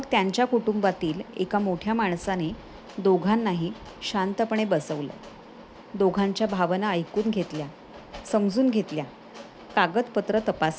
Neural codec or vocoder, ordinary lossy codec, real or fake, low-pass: none; none; real; none